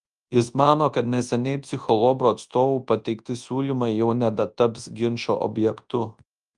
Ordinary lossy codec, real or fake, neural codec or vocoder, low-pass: Opus, 32 kbps; fake; codec, 24 kHz, 0.9 kbps, WavTokenizer, large speech release; 10.8 kHz